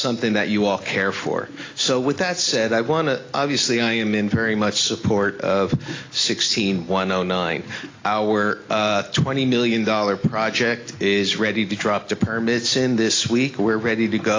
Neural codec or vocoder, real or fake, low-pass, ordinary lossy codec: none; real; 7.2 kHz; AAC, 32 kbps